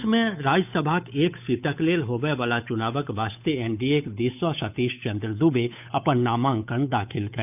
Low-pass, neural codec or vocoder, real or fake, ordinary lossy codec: 3.6 kHz; codec, 16 kHz, 8 kbps, FunCodec, trained on Chinese and English, 25 frames a second; fake; none